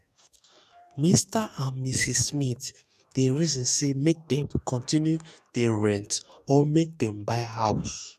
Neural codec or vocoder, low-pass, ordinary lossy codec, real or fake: codec, 44.1 kHz, 2.6 kbps, DAC; 14.4 kHz; none; fake